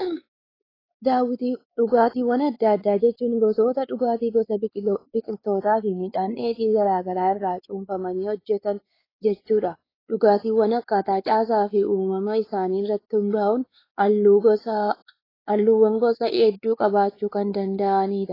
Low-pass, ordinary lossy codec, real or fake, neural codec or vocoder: 5.4 kHz; AAC, 24 kbps; fake; codec, 16 kHz, 4 kbps, X-Codec, WavLM features, trained on Multilingual LibriSpeech